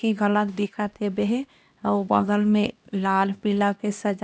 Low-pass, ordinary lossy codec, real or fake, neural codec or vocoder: none; none; fake; codec, 16 kHz, 1 kbps, X-Codec, HuBERT features, trained on LibriSpeech